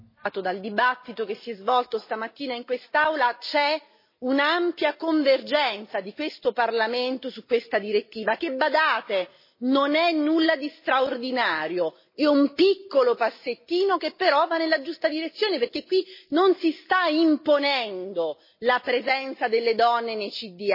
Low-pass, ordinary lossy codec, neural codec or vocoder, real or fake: 5.4 kHz; MP3, 24 kbps; none; real